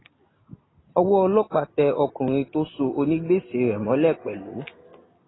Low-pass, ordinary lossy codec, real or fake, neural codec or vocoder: 7.2 kHz; AAC, 16 kbps; real; none